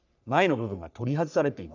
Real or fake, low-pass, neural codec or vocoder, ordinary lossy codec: fake; 7.2 kHz; codec, 44.1 kHz, 3.4 kbps, Pupu-Codec; none